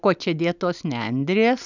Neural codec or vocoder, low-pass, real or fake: none; 7.2 kHz; real